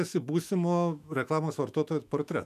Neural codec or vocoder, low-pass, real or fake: autoencoder, 48 kHz, 128 numbers a frame, DAC-VAE, trained on Japanese speech; 14.4 kHz; fake